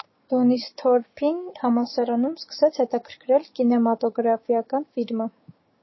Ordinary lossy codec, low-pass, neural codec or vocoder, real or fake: MP3, 24 kbps; 7.2 kHz; codec, 16 kHz, 6 kbps, DAC; fake